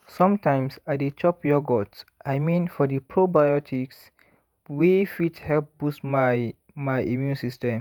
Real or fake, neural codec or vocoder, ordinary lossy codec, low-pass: fake; vocoder, 48 kHz, 128 mel bands, Vocos; none; none